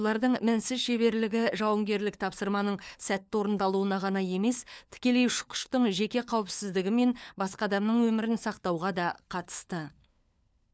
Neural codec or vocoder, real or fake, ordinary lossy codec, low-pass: codec, 16 kHz, 4 kbps, FunCodec, trained on LibriTTS, 50 frames a second; fake; none; none